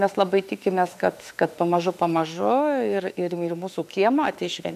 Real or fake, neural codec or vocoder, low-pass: fake; autoencoder, 48 kHz, 32 numbers a frame, DAC-VAE, trained on Japanese speech; 14.4 kHz